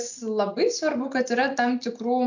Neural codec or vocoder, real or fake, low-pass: none; real; 7.2 kHz